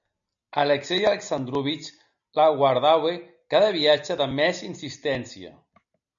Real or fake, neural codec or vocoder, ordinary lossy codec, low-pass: real; none; MP3, 96 kbps; 7.2 kHz